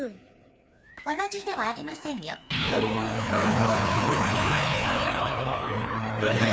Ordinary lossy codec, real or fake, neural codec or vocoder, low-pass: none; fake; codec, 16 kHz, 2 kbps, FreqCodec, larger model; none